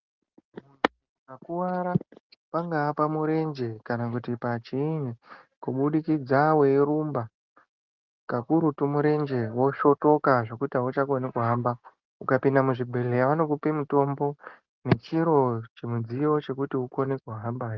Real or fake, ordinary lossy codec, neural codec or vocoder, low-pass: real; Opus, 32 kbps; none; 7.2 kHz